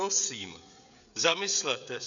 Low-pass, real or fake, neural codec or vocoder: 7.2 kHz; fake; codec, 16 kHz, 16 kbps, FreqCodec, smaller model